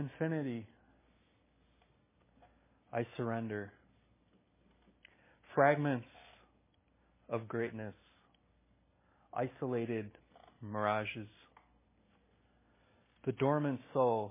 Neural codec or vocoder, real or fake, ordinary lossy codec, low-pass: none; real; MP3, 16 kbps; 3.6 kHz